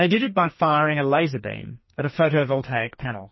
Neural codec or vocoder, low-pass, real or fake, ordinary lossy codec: codec, 44.1 kHz, 2.6 kbps, SNAC; 7.2 kHz; fake; MP3, 24 kbps